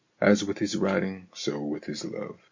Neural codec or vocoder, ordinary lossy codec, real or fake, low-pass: none; MP3, 48 kbps; real; 7.2 kHz